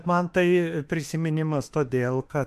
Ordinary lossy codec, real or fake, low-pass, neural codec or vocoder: MP3, 64 kbps; fake; 14.4 kHz; autoencoder, 48 kHz, 32 numbers a frame, DAC-VAE, trained on Japanese speech